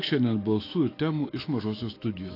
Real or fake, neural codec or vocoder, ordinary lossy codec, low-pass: fake; autoencoder, 48 kHz, 128 numbers a frame, DAC-VAE, trained on Japanese speech; AAC, 24 kbps; 5.4 kHz